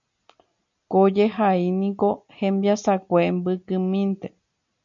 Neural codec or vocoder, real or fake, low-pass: none; real; 7.2 kHz